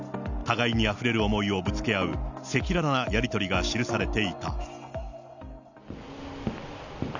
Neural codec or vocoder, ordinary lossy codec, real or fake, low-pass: none; none; real; 7.2 kHz